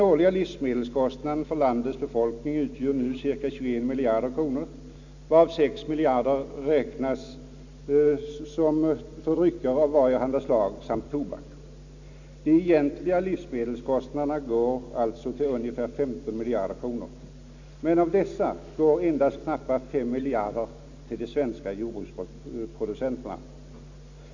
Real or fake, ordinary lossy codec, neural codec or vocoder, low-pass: real; none; none; 7.2 kHz